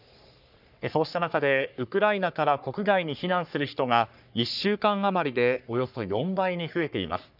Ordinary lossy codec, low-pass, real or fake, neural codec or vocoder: none; 5.4 kHz; fake; codec, 44.1 kHz, 3.4 kbps, Pupu-Codec